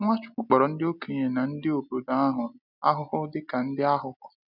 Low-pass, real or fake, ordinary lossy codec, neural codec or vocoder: 5.4 kHz; real; none; none